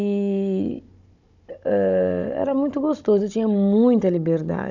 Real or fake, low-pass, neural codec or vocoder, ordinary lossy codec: fake; 7.2 kHz; codec, 16 kHz, 16 kbps, FunCodec, trained on Chinese and English, 50 frames a second; none